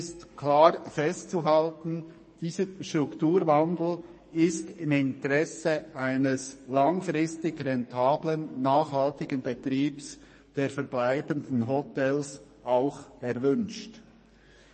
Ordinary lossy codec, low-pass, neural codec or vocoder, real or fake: MP3, 32 kbps; 10.8 kHz; codec, 44.1 kHz, 2.6 kbps, SNAC; fake